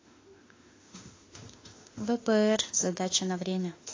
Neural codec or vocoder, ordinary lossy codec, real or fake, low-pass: autoencoder, 48 kHz, 32 numbers a frame, DAC-VAE, trained on Japanese speech; AAC, 32 kbps; fake; 7.2 kHz